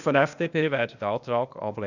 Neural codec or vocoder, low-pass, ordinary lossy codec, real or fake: codec, 16 kHz, 0.8 kbps, ZipCodec; 7.2 kHz; none; fake